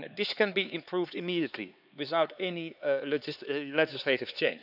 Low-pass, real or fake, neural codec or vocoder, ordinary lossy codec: 5.4 kHz; fake; codec, 16 kHz, 4 kbps, X-Codec, HuBERT features, trained on LibriSpeech; none